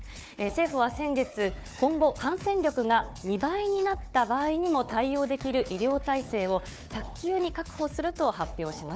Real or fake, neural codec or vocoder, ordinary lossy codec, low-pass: fake; codec, 16 kHz, 4 kbps, FunCodec, trained on Chinese and English, 50 frames a second; none; none